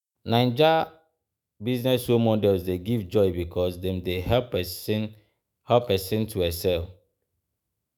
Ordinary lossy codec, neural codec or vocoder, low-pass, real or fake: none; autoencoder, 48 kHz, 128 numbers a frame, DAC-VAE, trained on Japanese speech; none; fake